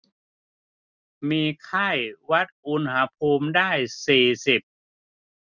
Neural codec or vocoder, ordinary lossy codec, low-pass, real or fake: none; none; 7.2 kHz; real